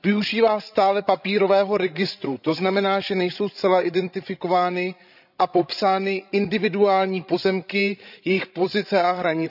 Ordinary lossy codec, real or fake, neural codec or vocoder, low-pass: none; fake; codec, 16 kHz, 16 kbps, FreqCodec, larger model; 5.4 kHz